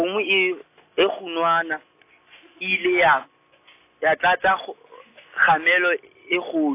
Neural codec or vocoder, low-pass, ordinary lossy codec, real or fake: none; 3.6 kHz; AAC, 24 kbps; real